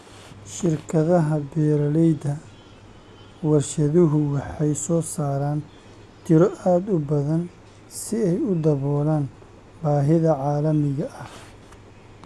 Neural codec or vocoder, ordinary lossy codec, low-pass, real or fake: none; none; none; real